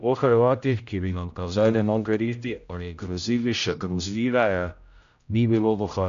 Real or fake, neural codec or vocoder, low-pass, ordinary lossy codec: fake; codec, 16 kHz, 0.5 kbps, X-Codec, HuBERT features, trained on general audio; 7.2 kHz; AAC, 96 kbps